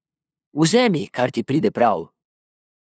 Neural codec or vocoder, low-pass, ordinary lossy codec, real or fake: codec, 16 kHz, 2 kbps, FunCodec, trained on LibriTTS, 25 frames a second; none; none; fake